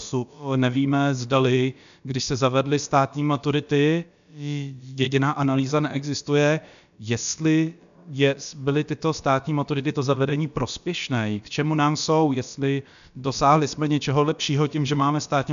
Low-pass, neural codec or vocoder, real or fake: 7.2 kHz; codec, 16 kHz, about 1 kbps, DyCAST, with the encoder's durations; fake